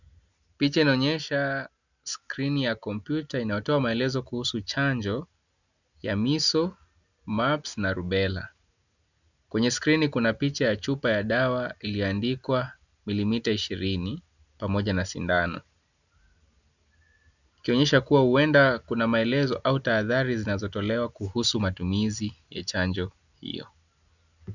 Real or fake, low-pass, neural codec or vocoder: real; 7.2 kHz; none